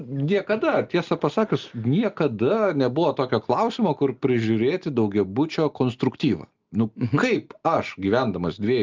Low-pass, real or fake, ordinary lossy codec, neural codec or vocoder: 7.2 kHz; real; Opus, 32 kbps; none